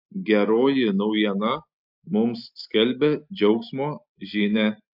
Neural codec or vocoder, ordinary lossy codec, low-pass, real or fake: none; MP3, 48 kbps; 5.4 kHz; real